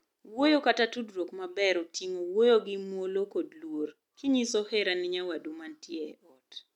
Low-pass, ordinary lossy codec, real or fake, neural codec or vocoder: 19.8 kHz; none; real; none